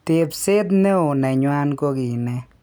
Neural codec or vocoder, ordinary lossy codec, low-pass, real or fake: none; none; none; real